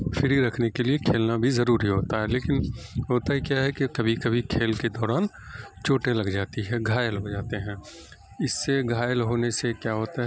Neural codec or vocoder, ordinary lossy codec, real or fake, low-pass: none; none; real; none